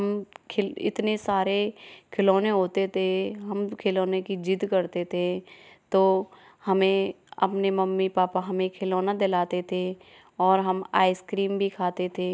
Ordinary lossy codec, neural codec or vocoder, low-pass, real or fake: none; none; none; real